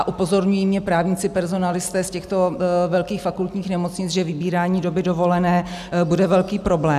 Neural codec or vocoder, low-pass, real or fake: none; 14.4 kHz; real